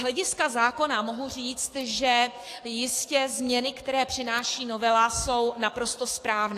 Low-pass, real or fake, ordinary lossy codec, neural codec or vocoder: 14.4 kHz; fake; AAC, 64 kbps; codec, 44.1 kHz, 7.8 kbps, DAC